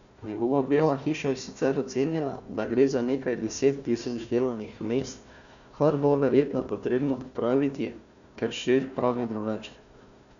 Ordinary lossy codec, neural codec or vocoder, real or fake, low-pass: none; codec, 16 kHz, 1 kbps, FunCodec, trained on Chinese and English, 50 frames a second; fake; 7.2 kHz